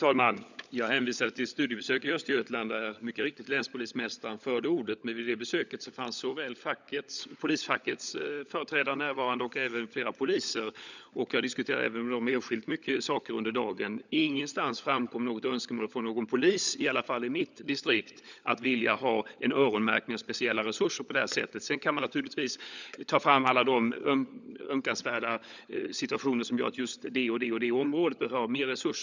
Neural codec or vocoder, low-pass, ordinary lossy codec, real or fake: codec, 16 kHz, 16 kbps, FunCodec, trained on LibriTTS, 50 frames a second; 7.2 kHz; none; fake